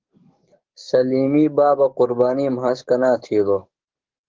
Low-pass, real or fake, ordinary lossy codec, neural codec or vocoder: 7.2 kHz; fake; Opus, 16 kbps; codec, 44.1 kHz, 7.8 kbps, DAC